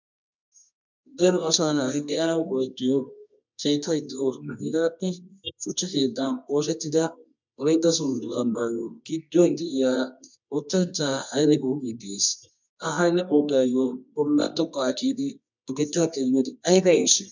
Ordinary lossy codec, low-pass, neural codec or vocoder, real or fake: MP3, 64 kbps; 7.2 kHz; codec, 24 kHz, 0.9 kbps, WavTokenizer, medium music audio release; fake